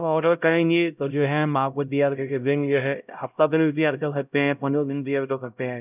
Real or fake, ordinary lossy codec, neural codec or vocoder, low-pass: fake; none; codec, 16 kHz, 0.5 kbps, X-Codec, HuBERT features, trained on LibriSpeech; 3.6 kHz